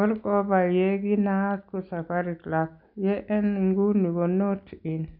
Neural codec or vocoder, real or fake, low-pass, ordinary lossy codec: none; real; 5.4 kHz; none